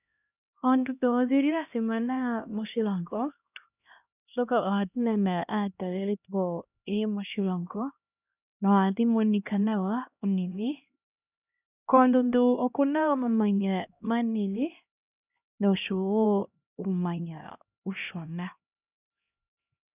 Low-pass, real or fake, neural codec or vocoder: 3.6 kHz; fake; codec, 16 kHz, 1 kbps, X-Codec, HuBERT features, trained on LibriSpeech